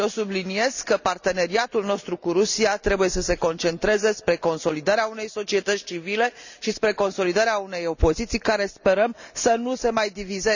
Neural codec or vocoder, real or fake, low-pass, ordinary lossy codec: none; real; 7.2 kHz; none